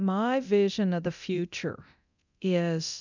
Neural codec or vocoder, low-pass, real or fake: codec, 24 kHz, 0.9 kbps, DualCodec; 7.2 kHz; fake